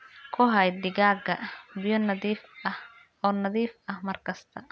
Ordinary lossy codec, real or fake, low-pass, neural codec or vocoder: none; real; none; none